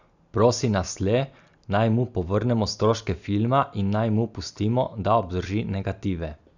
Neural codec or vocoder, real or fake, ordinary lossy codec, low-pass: none; real; none; 7.2 kHz